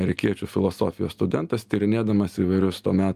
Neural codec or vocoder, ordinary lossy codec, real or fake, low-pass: none; Opus, 32 kbps; real; 14.4 kHz